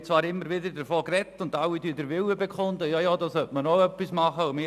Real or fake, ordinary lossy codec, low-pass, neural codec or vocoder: real; none; 14.4 kHz; none